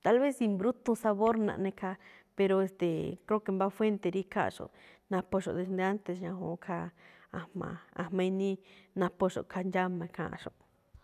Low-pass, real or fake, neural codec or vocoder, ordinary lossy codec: 14.4 kHz; real; none; none